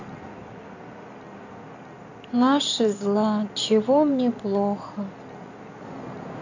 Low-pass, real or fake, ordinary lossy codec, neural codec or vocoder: 7.2 kHz; fake; none; codec, 16 kHz in and 24 kHz out, 2.2 kbps, FireRedTTS-2 codec